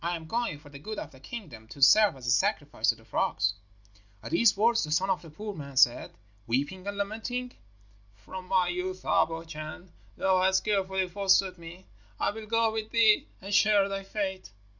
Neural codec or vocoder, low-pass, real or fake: none; 7.2 kHz; real